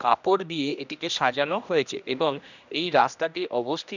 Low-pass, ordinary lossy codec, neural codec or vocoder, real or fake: 7.2 kHz; none; codec, 16 kHz, 1 kbps, X-Codec, HuBERT features, trained on general audio; fake